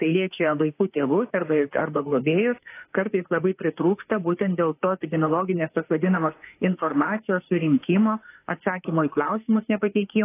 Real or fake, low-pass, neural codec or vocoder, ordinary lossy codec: fake; 3.6 kHz; autoencoder, 48 kHz, 32 numbers a frame, DAC-VAE, trained on Japanese speech; AAC, 24 kbps